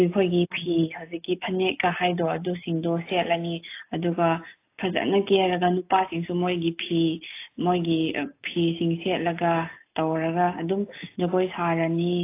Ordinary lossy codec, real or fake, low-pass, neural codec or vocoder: AAC, 24 kbps; real; 3.6 kHz; none